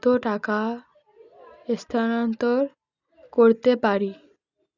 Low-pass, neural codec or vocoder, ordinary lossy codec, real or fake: 7.2 kHz; none; none; real